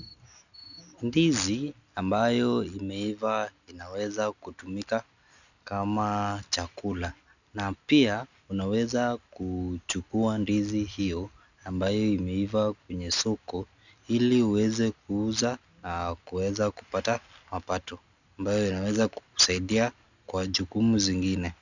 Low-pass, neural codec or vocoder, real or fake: 7.2 kHz; none; real